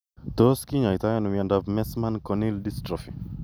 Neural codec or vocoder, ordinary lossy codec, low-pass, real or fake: none; none; none; real